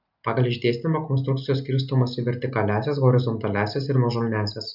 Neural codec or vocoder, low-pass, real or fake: none; 5.4 kHz; real